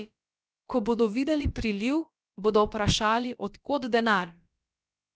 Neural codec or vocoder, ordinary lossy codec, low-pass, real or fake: codec, 16 kHz, about 1 kbps, DyCAST, with the encoder's durations; none; none; fake